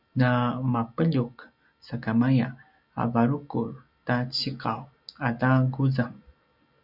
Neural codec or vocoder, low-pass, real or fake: none; 5.4 kHz; real